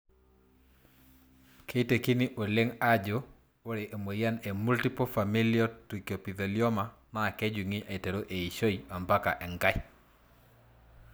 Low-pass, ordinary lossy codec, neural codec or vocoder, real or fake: none; none; none; real